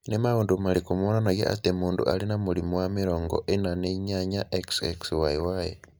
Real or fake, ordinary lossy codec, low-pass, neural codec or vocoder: real; none; none; none